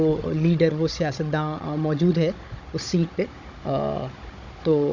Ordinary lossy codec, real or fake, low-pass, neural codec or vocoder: MP3, 64 kbps; fake; 7.2 kHz; codec, 16 kHz, 16 kbps, FunCodec, trained on Chinese and English, 50 frames a second